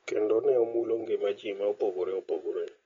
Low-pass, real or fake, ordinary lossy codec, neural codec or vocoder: 7.2 kHz; real; AAC, 24 kbps; none